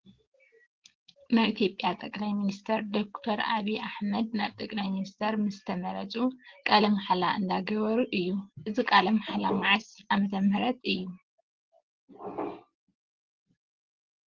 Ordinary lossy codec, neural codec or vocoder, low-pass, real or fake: Opus, 16 kbps; none; 7.2 kHz; real